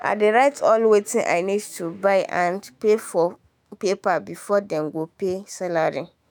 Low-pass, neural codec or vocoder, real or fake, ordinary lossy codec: none; autoencoder, 48 kHz, 128 numbers a frame, DAC-VAE, trained on Japanese speech; fake; none